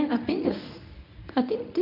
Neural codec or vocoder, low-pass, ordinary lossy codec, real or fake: codec, 24 kHz, 0.9 kbps, WavTokenizer, medium speech release version 1; 5.4 kHz; none; fake